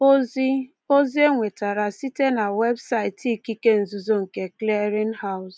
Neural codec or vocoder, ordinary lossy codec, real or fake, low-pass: none; none; real; none